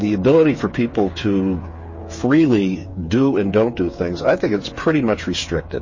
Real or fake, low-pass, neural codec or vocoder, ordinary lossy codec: fake; 7.2 kHz; codec, 16 kHz, 8 kbps, FreqCodec, smaller model; MP3, 32 kbps